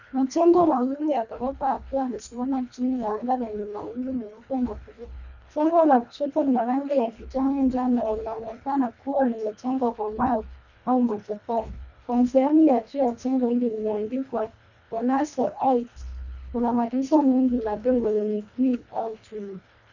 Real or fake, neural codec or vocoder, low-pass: fake; codec, 24 kHz, 1.5 kbps, HILCodec; 7.2 kHz